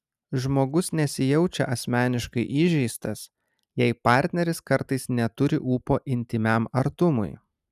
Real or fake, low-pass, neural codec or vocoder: real; 14.4 kHz; none